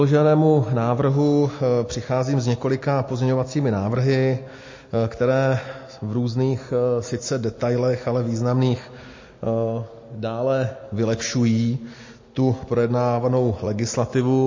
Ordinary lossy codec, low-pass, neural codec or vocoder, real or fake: MP3, 32 kbps; 7.2 kHz; none; real